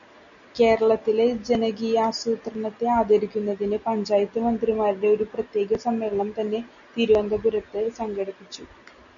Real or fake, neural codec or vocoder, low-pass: real; none; 7.2 kHz